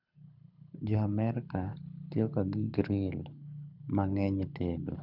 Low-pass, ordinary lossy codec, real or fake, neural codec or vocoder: 5.4 kHz; none; fake; codec, 24 kHz, 6 kbps, HILCodec